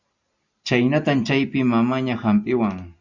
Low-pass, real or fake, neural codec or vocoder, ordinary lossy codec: 7.2 kHz; real; none; Opus, 64 kbps